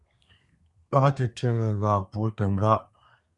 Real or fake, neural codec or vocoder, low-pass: fake; codec, 24 kHz, 1 kbps, SNAC; 10.8 kHz